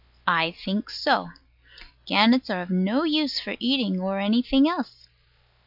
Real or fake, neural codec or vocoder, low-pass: real; none; 5.4 kHz